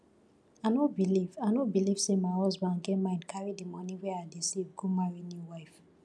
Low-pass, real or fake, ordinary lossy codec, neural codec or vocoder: none; real; none; none